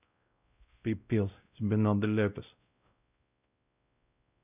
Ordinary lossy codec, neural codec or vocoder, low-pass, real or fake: none; codec, 16 kHz, 0.5 kbps, X-Codec, WavLM features, trained on Multilingual LibriSpeech; 3.6 kHz; fake